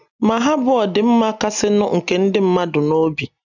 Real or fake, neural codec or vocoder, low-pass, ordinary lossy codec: real; none; 7.2 kHz; none